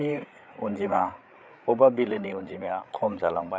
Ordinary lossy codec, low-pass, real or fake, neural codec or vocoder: none; none; fake; codec, 16 kHz, 8 kbps, FreqCodec, larger model